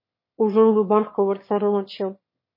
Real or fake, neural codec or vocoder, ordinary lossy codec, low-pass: fake; autoencoder, 22.05 kHz, a latent of 192 numbers a frame, VITS, trained on one speaker; MP3, 24 kbps; 5.4 kHz